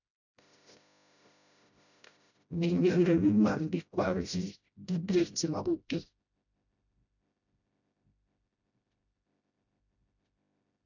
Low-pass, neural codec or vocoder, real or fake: 7.2 kHz; codec, 16 kHz, 0.5 kbps, FreqCodec, smaller model; fake